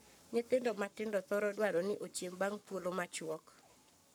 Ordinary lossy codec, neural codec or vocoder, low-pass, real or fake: none; codec, 44.1 kHz, 7.8 kbps, Pupu-Codec; none; fake